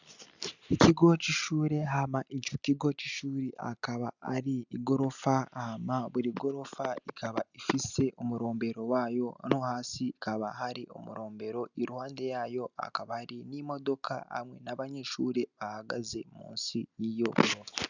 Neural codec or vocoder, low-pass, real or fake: none; 7.2 kHz; real